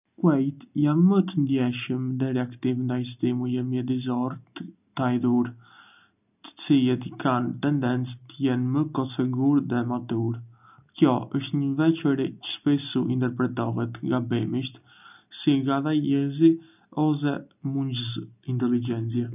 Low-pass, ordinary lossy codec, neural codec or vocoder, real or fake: 3.6 kHz; none; none; real